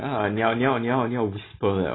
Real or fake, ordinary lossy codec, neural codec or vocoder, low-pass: real; AAC, 16 kbps; none; 7.2 kHz